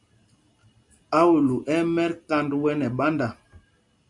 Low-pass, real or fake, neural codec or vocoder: 10.8 kHz; real; none